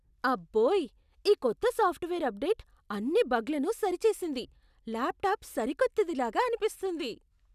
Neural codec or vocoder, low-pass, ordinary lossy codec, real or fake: none; 14.4 kHz; none; real